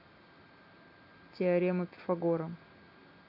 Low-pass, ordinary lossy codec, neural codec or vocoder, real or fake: 5.4 kHz; none; none; real